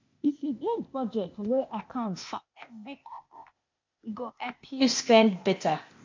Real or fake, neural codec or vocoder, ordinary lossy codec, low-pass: fake; codec, 16 kHz, 0.8 kbps, ZipCodec; MP3, 48 kbps; 7.2 kHz